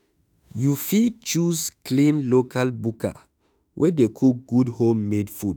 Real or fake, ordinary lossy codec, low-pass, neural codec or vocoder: fake; none; none; autoencoder, 48 kHz, 32 numbers a frame, DAC-VAE, trained on Japanese speech